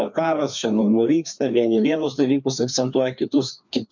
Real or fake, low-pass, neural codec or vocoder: fake; 7.2 kHz; codec, 16 kHz, 2 kbps, FreqCodec, larger model